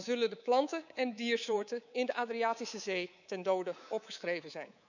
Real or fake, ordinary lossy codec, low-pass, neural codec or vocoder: fake; none; 7.2 kHz; codec, 24 kHz, 3.1 kbps, DualCodec